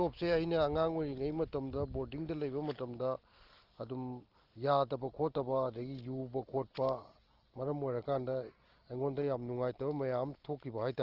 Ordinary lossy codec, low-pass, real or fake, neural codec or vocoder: Opus, 16 kbps; 5.4 kHz; real; none